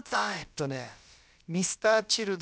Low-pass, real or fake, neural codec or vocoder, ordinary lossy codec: none; fake; codec, 16 kHz, about 1 kbps, DyCAST, with the encoder's durations; none